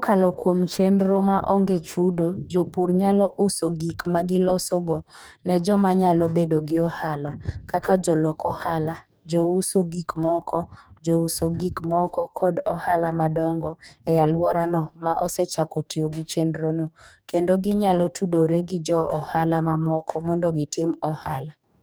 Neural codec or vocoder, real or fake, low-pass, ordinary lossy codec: codec, 44.1 kHz, 2.6 kbps, DAC; fake; none; none